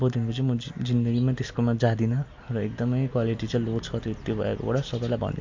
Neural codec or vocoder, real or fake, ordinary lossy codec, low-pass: none; real; MP3, 48 kbps; 7.2 kHz